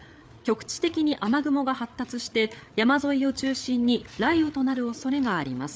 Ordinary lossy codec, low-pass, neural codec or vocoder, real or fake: none; none; codec, 16 kHz, 8 kbps, FreqCodec, larger model; fake